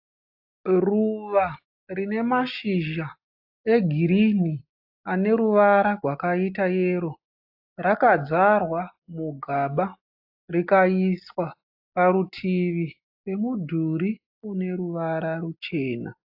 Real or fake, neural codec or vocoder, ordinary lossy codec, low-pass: real; none; AAC, 48 kbps; 5.4 kHz